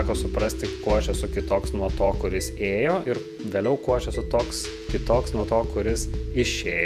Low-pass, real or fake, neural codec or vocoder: 14.4 kHz; fake; vocoder, 48 kHz, 128 mel bands, Vocos